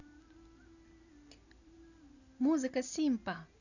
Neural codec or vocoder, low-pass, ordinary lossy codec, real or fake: none; 7.2 kHz; none; real